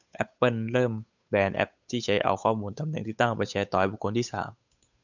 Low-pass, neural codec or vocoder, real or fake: 7.2 kHz; codec, 16 kHz, 8 kbps, FunCodec, trained on Chinese and English, 25 frames a second; fake